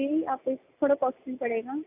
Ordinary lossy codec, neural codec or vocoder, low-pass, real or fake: AAC, 24 kbps; none; 3.6 kHz; real